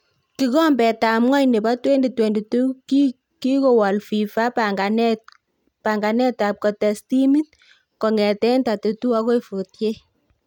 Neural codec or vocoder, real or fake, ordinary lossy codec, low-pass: none; real; none; 19.8 kHz